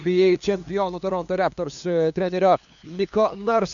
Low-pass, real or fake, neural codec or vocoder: 7.2 kHz; fake; codec, 16 kHz, 2 kbps, FunCodec, trained on LibriTTS, 25 frames a second